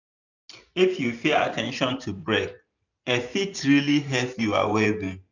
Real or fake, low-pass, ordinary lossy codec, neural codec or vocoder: fake; 7.2 kHz; none; vocoder, 44.1 kHz, 128 mel bands every 512 samples, BigVGAN v2